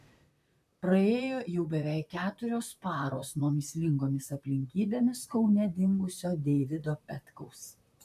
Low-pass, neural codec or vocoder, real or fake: 14.4 kHz; vocoder, 44.1 kHz, 128 mel bands, Pupu-Vocoder; fake